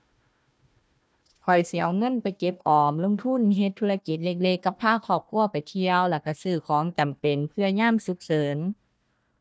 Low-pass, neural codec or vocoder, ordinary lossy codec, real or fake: none; codec, 16 kHz, 1 kbps, FunCodec, trained on Chinese and English, 50 frames a second; none; fake